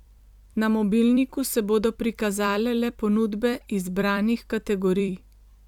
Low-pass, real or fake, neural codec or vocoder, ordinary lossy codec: 19.8 kHz; fake; vocoder, 44.1 kHz, 128 mel bands every 256 samples, BigVGAN v2; none